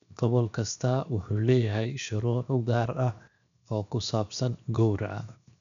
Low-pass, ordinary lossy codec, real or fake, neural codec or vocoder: 7.2 kHz; MP3, 96 kbps; fake; codec, 16 kHz, 0.7 kbps, FocalCodec